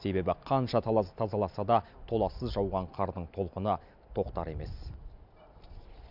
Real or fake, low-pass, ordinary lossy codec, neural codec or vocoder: real; 5.4 kHz; none; none